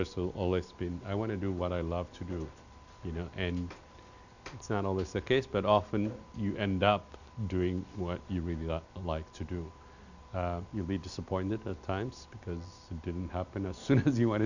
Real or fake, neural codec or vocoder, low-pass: real; none; 7.2 kHz